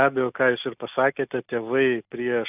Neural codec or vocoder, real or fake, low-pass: none; real; 3.6 kHz